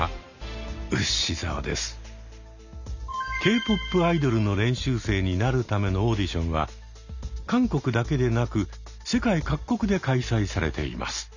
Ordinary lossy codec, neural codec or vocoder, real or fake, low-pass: none; none; real; 7.2 kHz